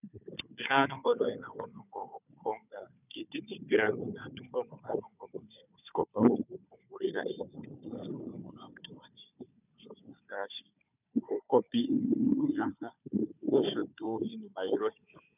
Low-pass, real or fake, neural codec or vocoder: 3.6 kHz; fake; codec, 16 kHz, 4 kbps, FunCodec, trained on Chinese and English, 50 frames a second